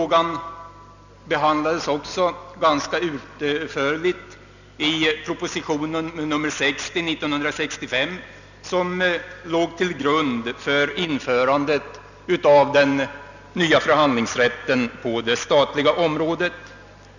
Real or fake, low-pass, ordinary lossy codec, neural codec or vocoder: real; 7.2 kHz; none; none